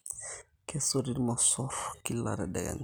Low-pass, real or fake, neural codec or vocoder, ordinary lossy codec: none; real; none; none